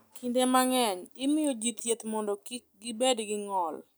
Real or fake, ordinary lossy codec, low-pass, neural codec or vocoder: real; none; none; none